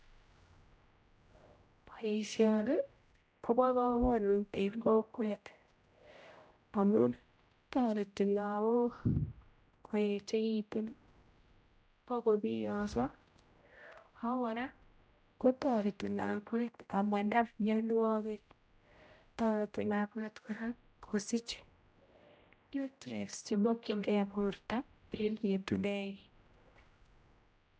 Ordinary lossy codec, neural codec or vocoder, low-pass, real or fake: none; codec, 16 kHz, 0.5 kbps, X-Codec, HuBERT features, trained on general audio; none; fake